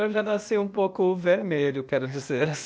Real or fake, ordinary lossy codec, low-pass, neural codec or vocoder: fake; none; none; codec, 16 kHz, 0.8 kbps, ZipCodec